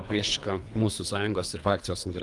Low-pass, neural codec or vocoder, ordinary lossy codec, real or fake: 10.8 kHz; codec, 24 kHz, 3 kbps, HILCodec; Opus, 32 kbps; fake